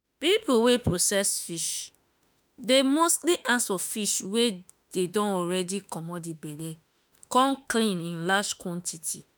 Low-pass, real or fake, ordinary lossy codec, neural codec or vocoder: none; fake; none; autoencoder, 48 kHz, 32 numbers a frame, DAC-VAE, trained on Japanese speech